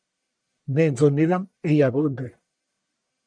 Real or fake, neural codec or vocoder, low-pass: fake; codec, 44.1 kHz, 1.7 kbps, Pupu-Codec; 9.9 kHz